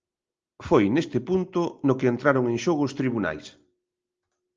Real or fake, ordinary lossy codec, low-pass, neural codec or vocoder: real; Opus, 24 kbps; 7.2 kHz; none